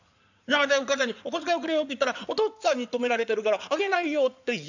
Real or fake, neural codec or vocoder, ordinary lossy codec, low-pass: fake; codec, 16 kHz in and 24 kHz out, 2.2 kbps, FireRedTTS-2 codec; none; 7.2 kHz